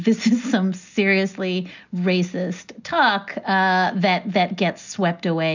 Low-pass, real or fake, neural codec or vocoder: 7.2 kHz; real; none